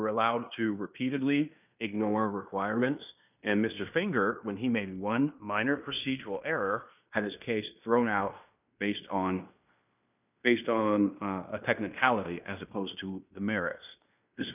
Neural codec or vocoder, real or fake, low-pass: codec, 16 kHz in and 24 kHz out, 0.9 kbps, LongCat-Audio-Codec, fine tuned four codebook decoder; fake; 3.6 kHz